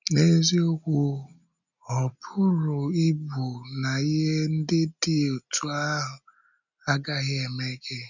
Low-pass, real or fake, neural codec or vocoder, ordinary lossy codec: 7.2 kHz; real; none; none